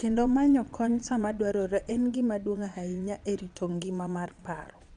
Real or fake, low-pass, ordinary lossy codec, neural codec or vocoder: fake; 9.9 kHz; none; vocoder, 22.05 kHz, 80 mel bands, Vocos